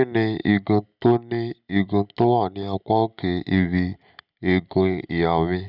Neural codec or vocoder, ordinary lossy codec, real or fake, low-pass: none; none; real; 5.4 kHz